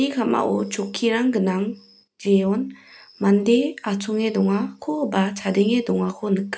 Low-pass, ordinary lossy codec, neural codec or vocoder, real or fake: none; none; none; real